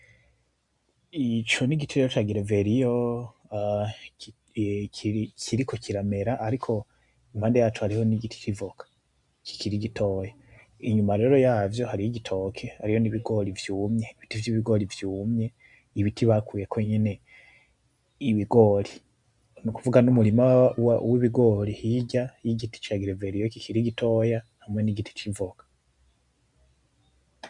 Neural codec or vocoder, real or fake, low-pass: none; real; 10.8 kHz